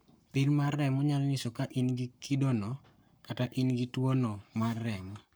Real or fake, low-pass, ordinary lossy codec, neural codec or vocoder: fake; none; none; codec, 44.1 kHz, 7.8 kbps, Pupu-Codec